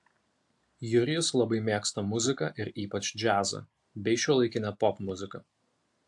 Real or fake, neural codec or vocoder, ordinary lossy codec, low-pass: real; none; AAC, 64 kbps; 10.8 kHz